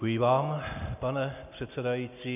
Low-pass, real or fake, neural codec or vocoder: 3.6 kHz; real; none